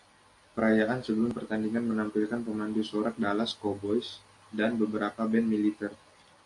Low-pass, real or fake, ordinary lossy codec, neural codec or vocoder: 10.8 kHz; real; AAC, 48 kbps; none